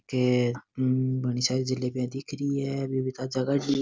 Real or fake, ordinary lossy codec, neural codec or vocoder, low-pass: real; none; none; none